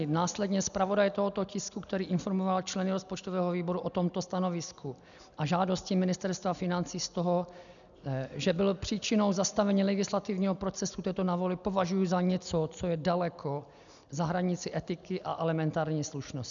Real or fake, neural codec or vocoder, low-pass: real; none; 7.2 kHz